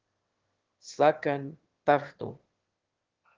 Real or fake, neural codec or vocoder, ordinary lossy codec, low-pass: fake; autoencoder, 22.05 kHz, a latent of 192 numbers a frame, VITS, trained on one speaker; Opus, 16 kbps; 7.2 kHz